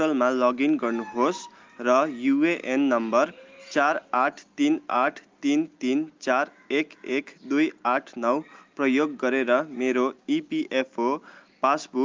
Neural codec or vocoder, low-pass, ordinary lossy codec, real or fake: none; 7.2 kHz; Opus, 32 kbps; real